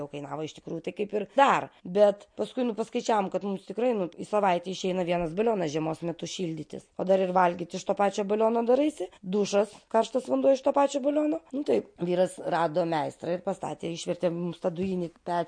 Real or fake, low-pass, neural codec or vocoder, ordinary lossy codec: real; 9.9 kHz; none; MP3, 48 kbps